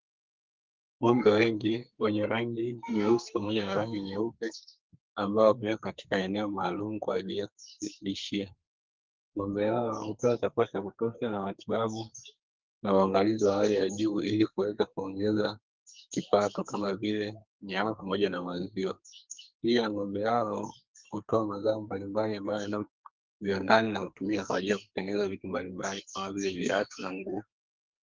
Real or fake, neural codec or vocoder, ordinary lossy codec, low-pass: fake; codec, 44.1 kHz, 2.6 kbps, SNAC; Opus, 32 kbps; 7.2 kHz